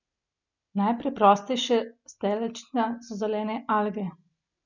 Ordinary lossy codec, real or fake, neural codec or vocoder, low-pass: none; real; none; 7.2 kHz